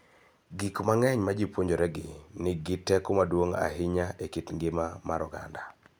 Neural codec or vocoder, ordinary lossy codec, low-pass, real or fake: none; none; none; real